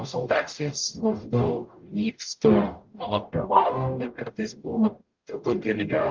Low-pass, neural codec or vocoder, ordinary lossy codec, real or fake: 7.2 kHz; codec, 44.1 kHz, 0.9 kbps, DAC; Opus, 32 kbps; fake